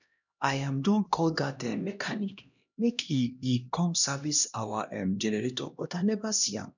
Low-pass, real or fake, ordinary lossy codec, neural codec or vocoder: 7.2 kHz; fake; none; codec, 16 kHz, 1 kbps, X-Codec, HuBERT features, trained on LibriSpeech